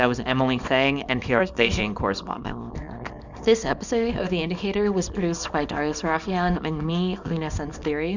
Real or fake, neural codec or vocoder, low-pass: fake; codec, 24 kHz, 0.9 kbps, WavTokenizer, small release; 7.2 kHz